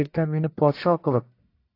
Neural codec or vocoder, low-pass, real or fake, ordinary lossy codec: codec, 44.1 kHz, 1.7 kbps, Pupu-Codec; 5.4 kHz; fake; AAC, 32 kbps